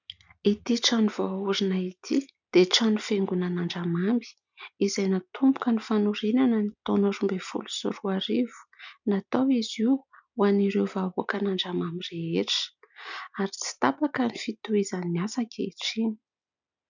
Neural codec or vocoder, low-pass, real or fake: none; 7.2 kHz; real